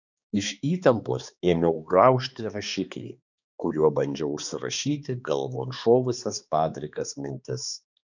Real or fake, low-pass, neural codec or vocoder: fake; 7.2 kHz; codec, 16 kHz, 2 kbps, X-Codec, HuBERT features, trained on balanced general audio